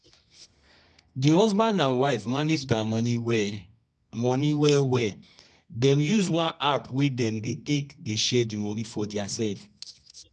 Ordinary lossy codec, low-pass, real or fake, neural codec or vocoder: none; 10.8 kHz; fake; codec, 24 kHz, 0.9 kbps, WavTokenizer, medium music audio release